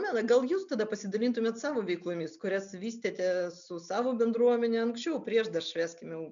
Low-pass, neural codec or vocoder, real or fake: 7.2 kHz; none; real